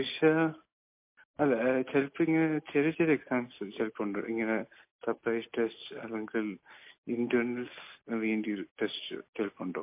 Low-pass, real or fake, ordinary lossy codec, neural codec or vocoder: 3.6 kHz; real; MP3, 24 kbps; none